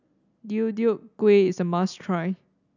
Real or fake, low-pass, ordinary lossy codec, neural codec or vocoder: real; 7.2 kHz; none; none